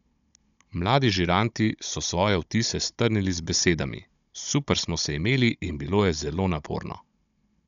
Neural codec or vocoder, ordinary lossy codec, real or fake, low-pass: codec, 16 kHz, 16 kbps, FunCodec, trained on Chinese and English, 50 frames a second; none; fake; 7.2 kHz